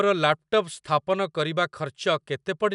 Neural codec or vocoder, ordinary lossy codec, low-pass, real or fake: none; none; 10.8 kHz; real